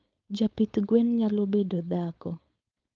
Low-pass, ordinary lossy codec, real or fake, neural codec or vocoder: 7.2 kHz; Opus, 24 kbps; fake; codec, 16 kHz, 4.8 kbps, FACodec